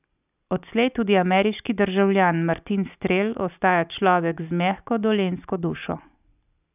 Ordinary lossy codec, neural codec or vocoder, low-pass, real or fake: none; none; 3.6 kHz; real